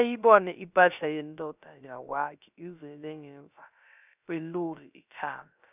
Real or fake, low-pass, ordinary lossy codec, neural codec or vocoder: fake; 3.6 kHz; none; codec, 16 kHz, 0.3 kbps, FocalCodec